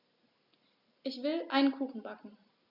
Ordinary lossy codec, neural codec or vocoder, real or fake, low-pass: Opus, 64 kbps; none; real; 5.4 kHz